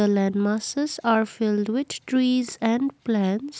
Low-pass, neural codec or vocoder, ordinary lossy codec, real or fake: none; none; none; real